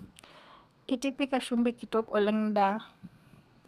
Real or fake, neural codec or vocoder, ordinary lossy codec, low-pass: fake; codec, 32 kHz, 1.9 kbps, SNAC; none; 14.4 kHz